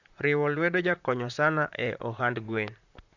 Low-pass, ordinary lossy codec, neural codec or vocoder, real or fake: 7.2 kHz; none; vocoder, 44.1 kHz, 128 mel bands, Pupu-Vocoder; fake